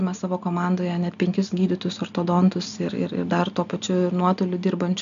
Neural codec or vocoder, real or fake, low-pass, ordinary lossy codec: none; real; 7.2 kHz; MP3, 96 kbps